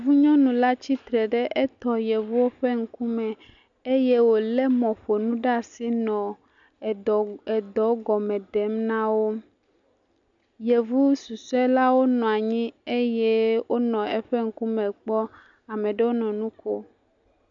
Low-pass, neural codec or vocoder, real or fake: 7.2 kHz; none; real